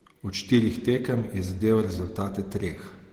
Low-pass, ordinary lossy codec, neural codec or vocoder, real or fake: 19.8 kHz; Opus, 16 kbps; vocoder, 48 kHz, 128 mel bands, Vocos; fake